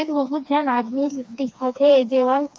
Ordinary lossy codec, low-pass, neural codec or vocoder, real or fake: none; none; codec, 16 kHz, 2 kbps, FreqCodec, smaller model; fake